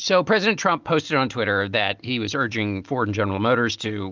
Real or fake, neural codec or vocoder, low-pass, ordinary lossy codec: real; none; 7.2 kHz; Opus, 32 kbps